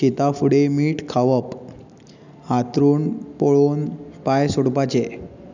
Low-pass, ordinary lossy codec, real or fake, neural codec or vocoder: 7.2 kHz; none; real; none